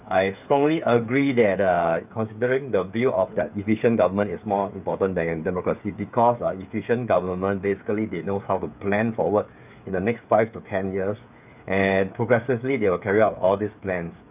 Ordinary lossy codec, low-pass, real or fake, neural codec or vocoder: none; 3.6 kHz; fake; codec, 16 kHz, 8 kbps, FreqCodec, smaller model